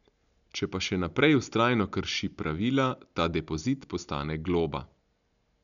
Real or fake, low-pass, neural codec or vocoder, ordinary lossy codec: real; 7.2 kHz; none; MP3, 96 kbps